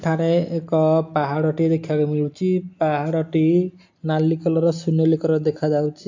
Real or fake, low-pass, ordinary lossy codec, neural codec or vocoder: real; 7.2 kHz; AAC, 48 kbps; none